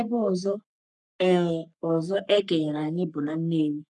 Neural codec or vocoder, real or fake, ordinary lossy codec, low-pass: codec, 44.1 kHz, 3.4 kbps, Pupu-Codec; fake; none; 10.8 kHz